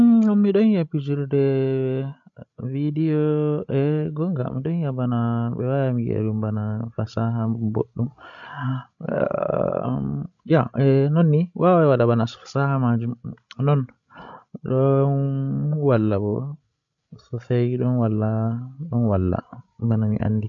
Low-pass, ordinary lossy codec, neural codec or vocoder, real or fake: 7.2 kHz; none; codec, 16 kHz, 16 kbps, FreqCodec, larger model; fake